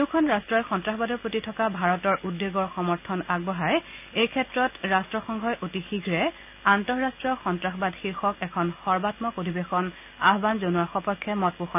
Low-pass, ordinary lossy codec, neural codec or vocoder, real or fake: 3.6 kHz; none; none; real